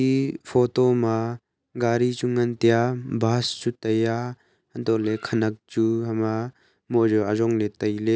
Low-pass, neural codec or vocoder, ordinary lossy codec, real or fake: none; none; none; real